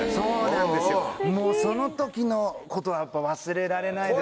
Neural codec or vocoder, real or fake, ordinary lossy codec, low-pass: none; real; none; none